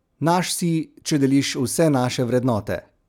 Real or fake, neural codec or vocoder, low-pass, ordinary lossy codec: real; none; 19.8 kHz; none